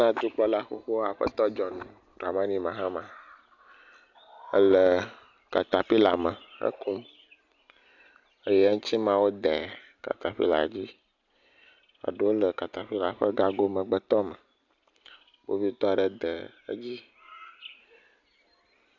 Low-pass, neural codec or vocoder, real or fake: 7.2 kHz; none; real